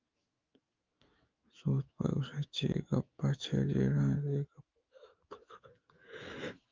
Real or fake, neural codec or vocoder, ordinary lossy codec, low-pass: fake; autoencoder, 48 kHz, 128 numbers a frame, DAC-VAE, trained on Japanese speech; Opus, 24 kbps; 7.2 kHz